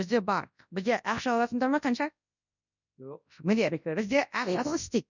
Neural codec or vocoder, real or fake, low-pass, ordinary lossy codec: codec, 24 kHz, 0.9 kbps, WavTokenizer, large speech release; fake; 7.2 kHz; none